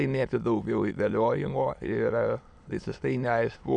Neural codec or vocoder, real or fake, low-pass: autoencoder, 22.05 kHz, a latent of 192 numbers a frame, VITS, trained on many speakers; fake; 9.9 kHz